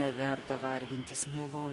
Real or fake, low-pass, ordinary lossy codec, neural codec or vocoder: fake; 14.4 kHz; MP3, 48 kbps; codec, 44.1 kHz, 2.6 kbps, DAC